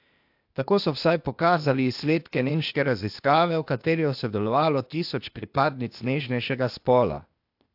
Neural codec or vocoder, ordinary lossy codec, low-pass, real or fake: codec, 16 kHz, 0.8 kbps, ZipCodec; AAC, 48 kbps; 5.4 kHz; fake